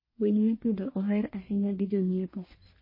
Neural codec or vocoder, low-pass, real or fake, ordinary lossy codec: codec, 44.1 kHz, 1.7 kbps, Pupu-Codec; 5.4 kHz; fake; MP3, 24 kbps